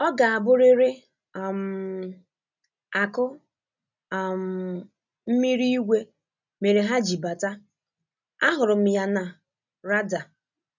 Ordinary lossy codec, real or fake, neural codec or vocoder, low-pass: none; real; none; 7.2 kHz